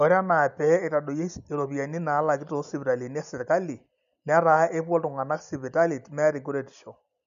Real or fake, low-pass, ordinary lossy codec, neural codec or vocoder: real; 7.2 kHz; none; none